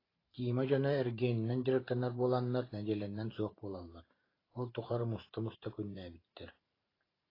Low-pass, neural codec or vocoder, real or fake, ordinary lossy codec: 5.4 kHz; vocoder, 44.1 kHz, 128 mel bands every 512 samples, BigVGAN v2; fake; AAC, 24 kbps